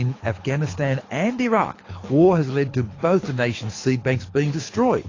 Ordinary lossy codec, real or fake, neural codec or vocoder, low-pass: AAC, 32 kbps; fake; codec, 24 kHz, 6 kbps, HILCodec; 7.2 kHz